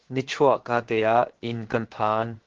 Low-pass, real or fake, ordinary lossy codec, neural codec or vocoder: 7.2 kHz; fake; Opus, 16 kbps; codec, 16 kHz, 0.7 kbps, FocalCodec